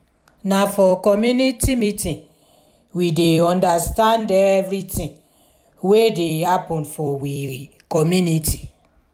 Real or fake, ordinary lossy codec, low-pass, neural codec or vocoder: fake; none; 19.8 kHz; vocoder, 44.1 kHz, 128 mel bands every 256 samples, BigVGAN v2